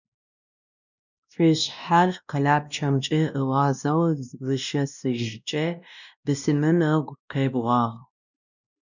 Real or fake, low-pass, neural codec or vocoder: fake; 7.2 kHz; codec, 16 kHz, 1 kbps, X-Codec, WavLM features, trained on Multilingual LibriSpeech